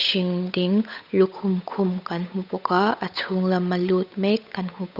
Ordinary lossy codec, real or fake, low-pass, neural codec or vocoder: none; fake; 5.4 kHz; codec, 16 kHz, 8 kbps, FunCodec, trained on Chinese and English, 25 frames a second